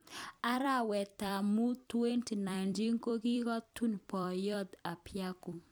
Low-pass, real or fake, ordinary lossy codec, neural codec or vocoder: none; fake; none; vocoder, 44.1 kHz, 128 mel bands every 256 samples, BigVGAN v2